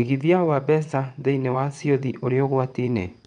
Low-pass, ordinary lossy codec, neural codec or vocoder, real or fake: 9.9 kHz; none; vocoder, 22.05 kHz, 80 mel bands, WaveNeXt; fake